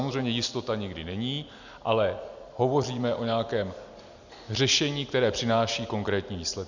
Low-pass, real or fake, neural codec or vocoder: 7.2 kHz; real; none